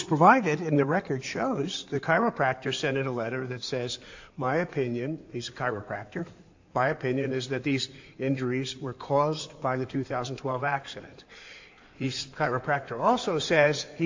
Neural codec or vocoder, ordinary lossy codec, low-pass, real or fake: codec, 16 kHz in and 24 kHz out, 2.2 kbps, FireRedTTS-2 codec; AAC, 48 kbps; 7.2 kHz; fake